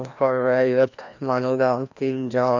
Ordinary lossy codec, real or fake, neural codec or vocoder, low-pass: none; fake; codec, 16 kHz, 1 kbps, FreqCodec, larger model; 7.2 kHz